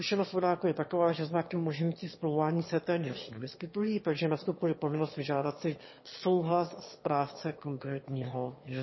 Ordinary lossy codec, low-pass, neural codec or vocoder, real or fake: MP3, 24 kbps; 7.2 kHz; autoencoder, 22.05 kHz, a latent of 192 numbers a frame, VITS, trained on one speaker; fake